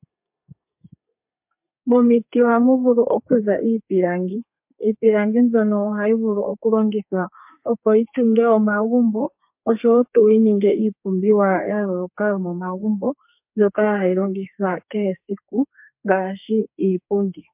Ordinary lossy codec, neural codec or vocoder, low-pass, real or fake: MP3, 32 kbps; codec, 32 kHz, 1.9 kbps, SNAC; 3.6 kHz; fake